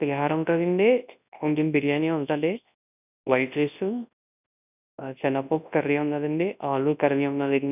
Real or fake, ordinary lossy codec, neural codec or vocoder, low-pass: fake; none; codec, 24 kHz, 0.9 kbps, WavTokenizer, large speech release; 3.6 kHz